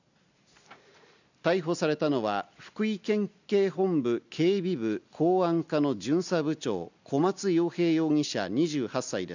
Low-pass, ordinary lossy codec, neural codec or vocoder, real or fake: 7.2 kHz; none; none; real